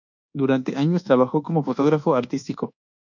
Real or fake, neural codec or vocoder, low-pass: fake; codec, 24 kHz, 1.2 kbps, DualCodec; 7.2 kHz